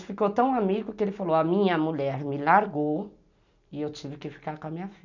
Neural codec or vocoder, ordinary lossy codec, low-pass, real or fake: none; none; 7.2 kHz; real